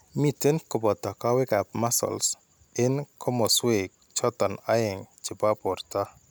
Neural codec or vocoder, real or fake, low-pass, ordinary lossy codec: none; real; none; none